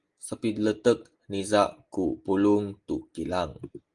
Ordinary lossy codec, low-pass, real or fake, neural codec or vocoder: Opus, 24 kbps; 10.8 kHz; real; none